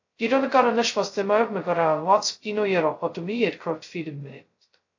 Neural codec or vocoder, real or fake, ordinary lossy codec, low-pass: codec, 16 kHz, 0.2 kbps, FocalCodec; fake; AAC, 48 kbps; 7.2 kHz